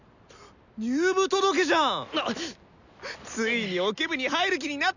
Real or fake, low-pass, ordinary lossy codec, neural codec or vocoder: real; 7.2 kHz; none; none